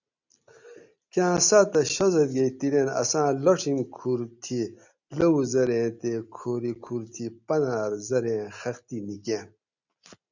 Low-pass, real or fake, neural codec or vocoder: 7.2 kHz; real; none